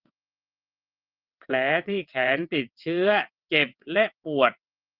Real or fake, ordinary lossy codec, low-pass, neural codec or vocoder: fake; Opus, 32 kbps; 5.4 kHz; vocoder, 22.05 kHz, 80 mel bands, WaveNeXt